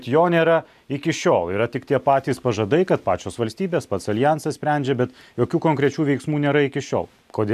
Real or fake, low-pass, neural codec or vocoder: real; 14.4 kHz; none